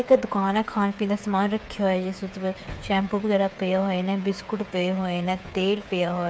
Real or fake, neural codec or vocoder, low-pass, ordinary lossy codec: fake; codec, 16 kHz, 8 kbps, FreqCodec, smaller model; none; none